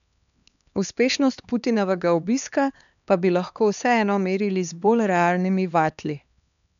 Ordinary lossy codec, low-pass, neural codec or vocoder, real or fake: none; 7.2 kHz; codec, 16 kHz, 2 kbps, X-Codec, HuBERT features, trained on LibriSpeech; fake